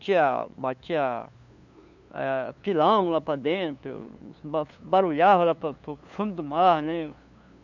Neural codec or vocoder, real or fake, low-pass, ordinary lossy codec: codec, 16 kHz, 2 kbps, FunCodec, trained on LibriTTS, 25 frames a second; fake; 7.2 kHz; none